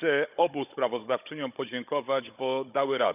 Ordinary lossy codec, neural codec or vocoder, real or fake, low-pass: none; codec, 16 kHz, 16 kbps, FunCodec, trained on Chinese and English, 50 frames a second; fake; 3.6 kHz